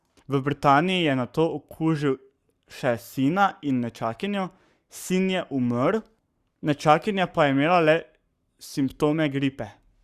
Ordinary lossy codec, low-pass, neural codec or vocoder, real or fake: Opus, 64 kbps; 14.4 kHz; codec, 44.1 kHz, 7.8 kbps, Pupu-Codec; fake